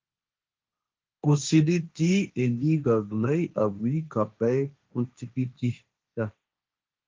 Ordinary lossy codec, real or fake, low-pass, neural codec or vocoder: Opus, 32 kbps; fake; 7.2 kHz; codec, 16 kHz, 1.1 kbps, Voila-Tokenizer